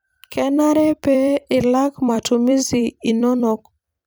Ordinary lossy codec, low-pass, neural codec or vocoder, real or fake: none; none; vocoder, 44.1 kHz, 128 mel bands every 256 samples, BigVGAN v2; fake